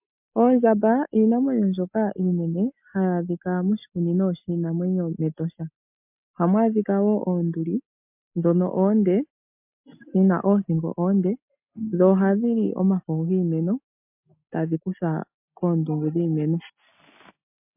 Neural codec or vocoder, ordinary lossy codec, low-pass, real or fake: none; MP3, 32 kbps; 3.6 kHz; real